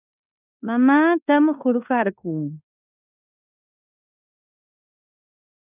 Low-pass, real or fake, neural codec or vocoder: 3.6 kHz; fake; codec, 16 kHz in and 24 kHz out, 0.9 kbps, LongCat-Audio-Codec, fine tuned four codebook decoder